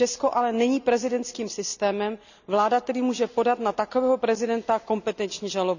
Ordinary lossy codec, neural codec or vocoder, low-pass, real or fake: none; none; 7.2 kHz; real